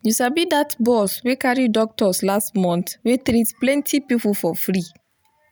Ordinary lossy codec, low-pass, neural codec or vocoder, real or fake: none; none; none; real